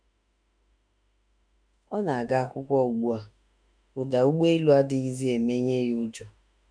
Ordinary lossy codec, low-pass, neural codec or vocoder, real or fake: MP3, 96 kbps; 9.9 kHz; autoencoder, 48 kHz, 32 numbers a frame, DAC-VAE, trained on Japanese speech; fake